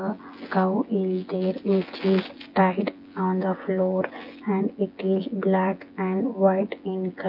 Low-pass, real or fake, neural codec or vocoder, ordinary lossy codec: 5.4 kHz; fake; vocoder, 24 kHz, 100 mel bands, Vocos; Opus, 24 kbps